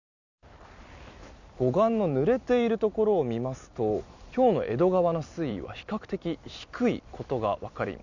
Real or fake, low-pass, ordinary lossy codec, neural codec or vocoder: fake; 7.2 kHz; none; vocoder, 44.1 kHz, 128 mel bands every 256 samples, BigVGAN v2